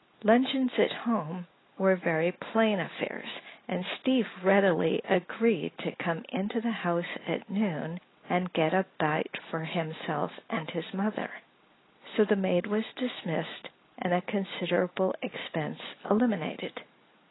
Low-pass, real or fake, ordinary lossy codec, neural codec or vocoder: 7.2 kHz; real; AAC, 16 kbps; none